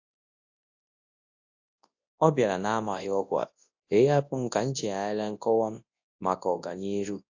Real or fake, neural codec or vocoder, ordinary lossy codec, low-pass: fake; codec, 24 kHz, 0.9 kbps, WavTokenizer, large speech release; AAC, 48 kbps; 7.2 kHz